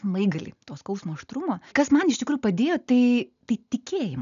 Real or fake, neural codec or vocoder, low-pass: real; none; 7.2 kHz